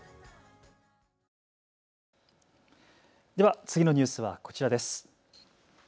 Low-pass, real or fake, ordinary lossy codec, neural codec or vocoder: none; real; none; none